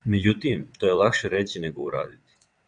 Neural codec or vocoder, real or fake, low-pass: vocoder, 22.05 kHz, 80 mel bands, WaveNeXt; fake; 9.9 kHz